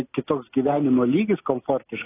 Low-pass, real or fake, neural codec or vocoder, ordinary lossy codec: 3.6 kHz; real; none; AAC, 16 kbps